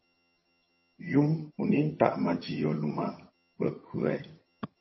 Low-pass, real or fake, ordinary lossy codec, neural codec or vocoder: 7.2 kHz; fake; MP3, 24 kbps; vocoder, 22.05 kHz, 80 mel bands, HiFi-GAN